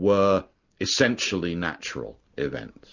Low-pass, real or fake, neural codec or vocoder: 7.2 kHz; real; none